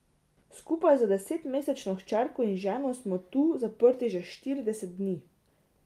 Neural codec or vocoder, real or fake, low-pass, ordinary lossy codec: none; real; 14.4 kHz; Opus, 32 kbps